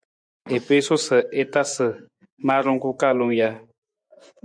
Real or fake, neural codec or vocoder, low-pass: fake; vocoder, 24 kHz, 100 mel bands, Vocos; 9.9 kHz